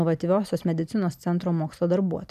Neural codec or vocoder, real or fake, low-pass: none; real; 14.4 kHz